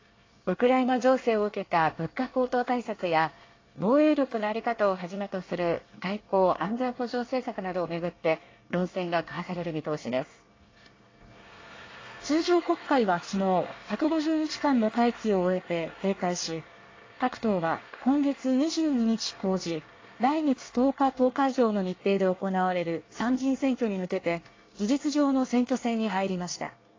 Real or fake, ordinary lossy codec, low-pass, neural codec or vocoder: fake; AAC, 32 kbps; 7.2 kHz; codec, 24 kHz, 1 kbps, SNAC